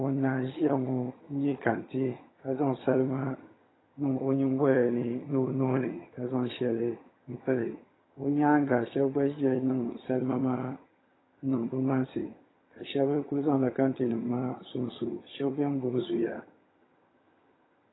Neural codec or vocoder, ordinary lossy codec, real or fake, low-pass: vocoder, 22.05 kHz, 80 mel bands, HiFi-GAN; AAC, 16 kbps; fake; 7.2 kHz